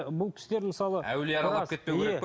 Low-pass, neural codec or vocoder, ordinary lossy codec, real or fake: none; none; none; real